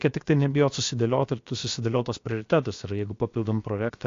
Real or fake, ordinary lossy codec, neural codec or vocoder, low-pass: fake; AAC, 48 kbps; codec, 16 kHz, about 1 kbps, DyCAST, with the encoder's durations; 7.2 kHz